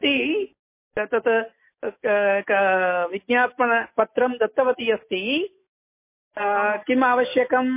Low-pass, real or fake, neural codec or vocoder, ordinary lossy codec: 3.6 kHz; real; none; MP3, 24 kbps